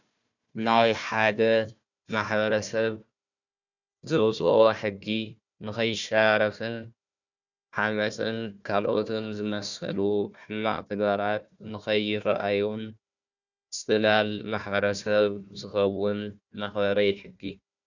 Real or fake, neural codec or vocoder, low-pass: fake; codec, 16 kHz, 1 kbps, FunCodec, trained on Chinese and English, 50 frames a second; 7.2 kHz